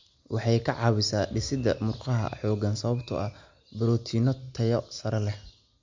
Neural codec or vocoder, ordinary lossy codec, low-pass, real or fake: none; MP3, 48 kbps; 7.2 kHz; real